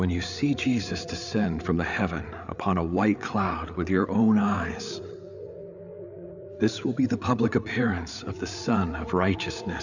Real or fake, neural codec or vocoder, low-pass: fake; codec, 16 kHz, 8 kbps, FreqCodec, larger model; 7.2 kHz